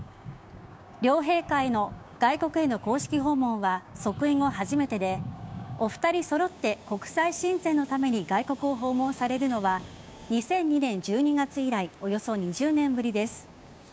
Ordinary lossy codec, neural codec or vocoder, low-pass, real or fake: none; codec, 16 kHz, 6 kbps, DAC; none; fake